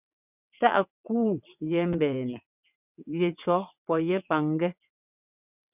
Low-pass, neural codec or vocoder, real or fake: 3.6 kHz; vocoder, 22.05 kHz, 80 mel bands, WaveNeXt; fake